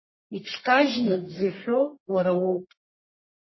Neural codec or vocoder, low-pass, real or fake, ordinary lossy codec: codec, 44.1 kHz, 1.7 kbps, Pupu-Codec; 7.2 kHz; fake; MP3, 24 kbps